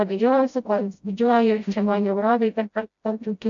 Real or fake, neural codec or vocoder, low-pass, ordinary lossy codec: fake; codec, 16 kHz, 0.5 kbps, FreqCodec, smaller model; 7.2 kHz; AAC, 64 kbps